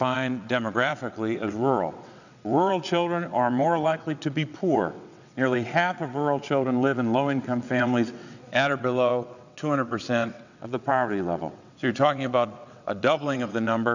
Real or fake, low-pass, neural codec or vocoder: fake; 7.2 kHz; vocoder, 22.05 kHz, 80 mel bands, WaveNeXt